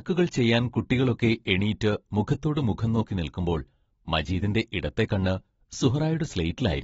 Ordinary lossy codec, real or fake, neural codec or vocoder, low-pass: AAC, 24 kbps; real; none; 19.8 kHz